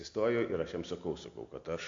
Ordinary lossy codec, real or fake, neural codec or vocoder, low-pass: AAC, 48 kbps; real; none; 7.2 kHz